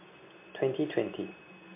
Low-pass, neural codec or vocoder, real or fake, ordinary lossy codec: 3.6 kHz; none; real; MP3, 24 kbps